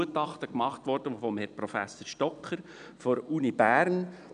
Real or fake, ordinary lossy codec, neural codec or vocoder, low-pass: real; none; none; 9.9 kHz